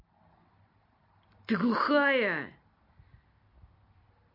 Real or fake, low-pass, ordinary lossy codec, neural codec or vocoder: real; 5.4 kHz; MP3, 32 kbps; none